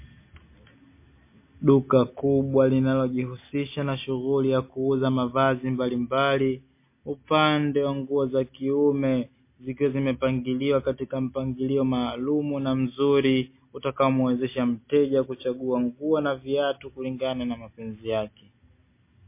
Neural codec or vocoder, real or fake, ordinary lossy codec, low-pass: none; real; MP3, 24 kbps; 3.6 kHz